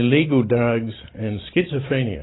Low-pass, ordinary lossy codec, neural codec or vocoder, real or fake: 7.2 kHz; AAC, 16 kbps; vocoder, 44.1 kHz, 128 mel bands every 512 samples, BigVGAN v2; fake